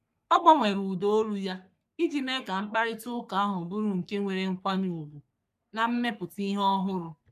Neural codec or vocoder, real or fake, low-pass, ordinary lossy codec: codec, 44.1 kHz, 3.4 kbps, Pupu-Codec; fake; 14.4 kHz; none